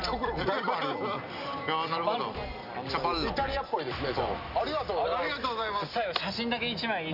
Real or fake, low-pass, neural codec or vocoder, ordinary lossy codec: real; 5.4 kHz; none; none